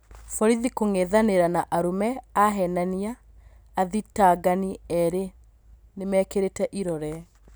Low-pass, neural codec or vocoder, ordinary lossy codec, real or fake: none; none; none; real